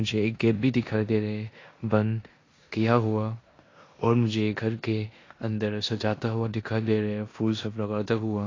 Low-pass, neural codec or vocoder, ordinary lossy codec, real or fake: 7.2 kHz; codec, 16 kHz in and 24 kHz out, 0.9 kbps, LongCat-Audio-Codec, four codebook decoder; AAC, 32 kbps; fake